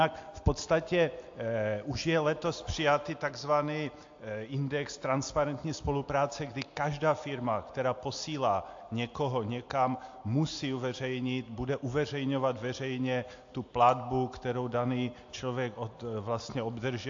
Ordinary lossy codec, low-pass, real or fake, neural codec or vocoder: AAC, 48 kbps; 7.2 kHz; real; none